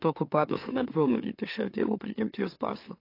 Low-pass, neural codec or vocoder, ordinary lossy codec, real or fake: 5.4 kHz; autoencoder, 44.1 kHz, a latent of 192 numbers a frame, MeloTTS; AAC, 32 kbps; fake